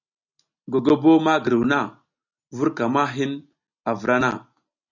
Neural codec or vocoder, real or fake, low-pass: none; real; 7.2 kHz